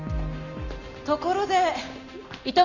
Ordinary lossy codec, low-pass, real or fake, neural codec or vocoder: none; 7.2 kHz; real; none